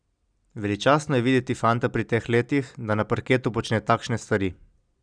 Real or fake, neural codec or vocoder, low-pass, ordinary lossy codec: real; none; 9.9 kHz; none